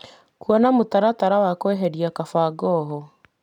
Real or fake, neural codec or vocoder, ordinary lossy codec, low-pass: real; none; none; 19.8 kHz